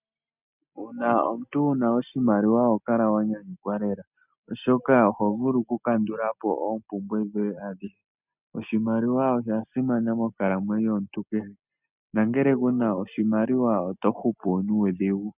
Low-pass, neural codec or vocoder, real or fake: 3.6 kHz; none; real